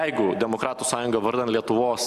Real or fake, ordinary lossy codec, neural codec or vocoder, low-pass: real; Opus, 64 kbps; none; 14.4 kHz